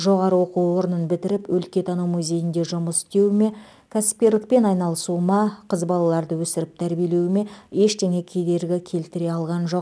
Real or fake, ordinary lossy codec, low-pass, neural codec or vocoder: real; none; none; none